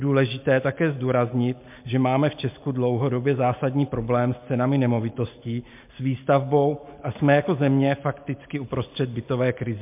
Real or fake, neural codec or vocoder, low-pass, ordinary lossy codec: real; none; 3.6 kHz; MP3, 32 kbps